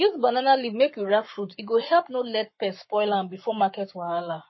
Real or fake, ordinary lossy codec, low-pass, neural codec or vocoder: fake; MP3, 24 kbps; 7.2 kHz; codec, 44.1 kHz, 7.8 kbps, Pupu-Codec